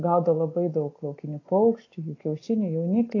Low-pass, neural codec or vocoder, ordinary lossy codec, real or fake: 7.2 kHz; none; AAC, 32 kbps; real